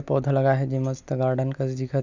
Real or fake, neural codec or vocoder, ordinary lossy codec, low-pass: real; none; none; 7.2 kHz